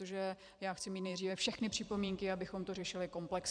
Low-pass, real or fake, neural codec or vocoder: 9.9 kHz; fake; vocoder, 44.1 kHz, 128 mel bands every 256 samples, BigVGAN v2